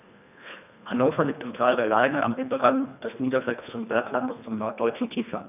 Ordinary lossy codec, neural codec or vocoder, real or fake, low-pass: none; codec, 24 kHz, 1.5 kbps, HILCodec; fake; 3.6 kHz